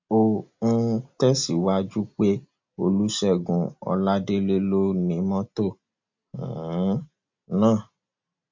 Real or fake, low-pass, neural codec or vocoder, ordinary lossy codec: real; 7.2 kHz; none; MP3, 48 kbps